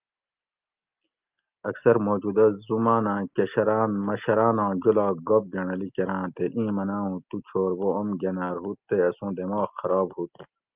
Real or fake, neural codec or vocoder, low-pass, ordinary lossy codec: real; none; 3.6 kHz; Opus, 32 kbps